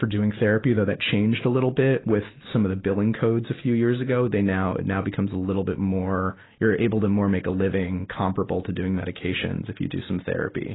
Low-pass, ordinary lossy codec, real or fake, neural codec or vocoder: 7.2 kHz; AAC, 16 kbps; real; none